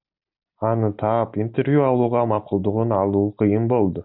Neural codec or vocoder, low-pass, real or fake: none; 5.4 kHz; real